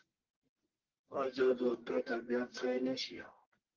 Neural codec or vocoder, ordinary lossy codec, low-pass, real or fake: codec, 44.1 kHz, 1.7 kbps, Pupu-Codec; Opus, 16 kbps; 7.2 kHz; fake